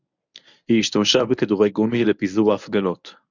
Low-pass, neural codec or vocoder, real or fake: 7.2 kHz; codec, 24 kHz, 0.9 kbps, WavTokenizer, medium speech release version 1; fake